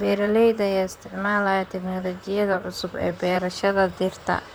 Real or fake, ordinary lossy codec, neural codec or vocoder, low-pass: fake; none; vocoder, 44.1 kHz, 128 mel bands, Pupu-Vocoder; none